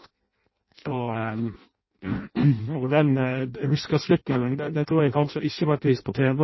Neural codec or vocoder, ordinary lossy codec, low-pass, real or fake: codec, 16 kHz in and 24 kHz out, 0.6 kbps, FireRedTTS-2 codec; MP3, 24 kbps; 7.2 kHz; fake